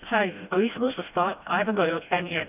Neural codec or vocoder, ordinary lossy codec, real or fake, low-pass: codec, 16 kHz, 1 kbps, FreqCodec, smaller model; none; fake; 3.6 kHz